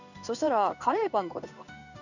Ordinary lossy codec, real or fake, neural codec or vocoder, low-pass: none; fake; codec, 16 kHz in and 24 kHz out, 1 kbps, XY-Tokenizer; 7.2 kHz